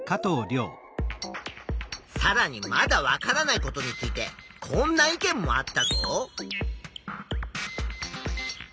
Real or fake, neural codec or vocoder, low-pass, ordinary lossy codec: real; none; none; none